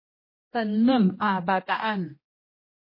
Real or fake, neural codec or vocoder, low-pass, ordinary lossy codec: fake; codec, 16 kHz, 1 kbps, X-Codec, HuBERT features, trained on general audio; 5.4 kHz; MP3, 24 kbps